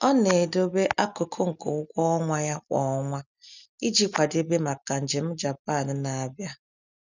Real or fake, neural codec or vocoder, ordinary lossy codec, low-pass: real; none; none; 7.2 kHz